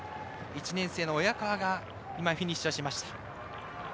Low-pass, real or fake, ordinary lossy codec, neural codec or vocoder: none; real; none; none